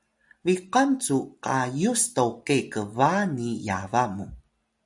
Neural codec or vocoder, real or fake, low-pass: none; real; 10.8 kHz